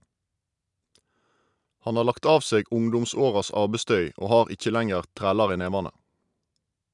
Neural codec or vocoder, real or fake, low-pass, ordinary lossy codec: none; real; 10.8 kHz; none